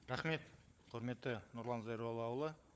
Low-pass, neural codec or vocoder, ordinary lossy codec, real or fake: none; codec, 16 kHz, 4 kbps, FunCodec, trained on Chinese and English, 50 frames a second; none; fake